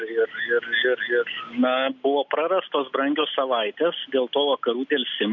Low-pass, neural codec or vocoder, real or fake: 7.2 kHz; none; real